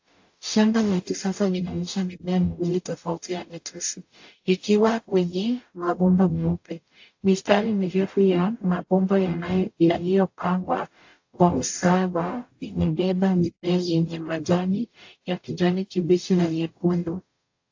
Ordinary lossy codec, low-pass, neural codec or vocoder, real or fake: AAC, 48 kbps; 7.2 kHz; codec, 44.1 kHz, 0.9 kbps, DAC; fake